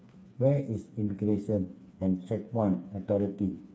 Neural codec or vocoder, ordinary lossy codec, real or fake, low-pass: codec, 16 kHz, 4 kbps, FreqCodec, smaller model; none; fake; none